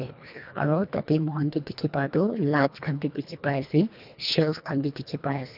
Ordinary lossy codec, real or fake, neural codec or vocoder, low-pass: none; fake; codec, 24 kHz, 1.5 kbps, HILCodec; 5.4 kHz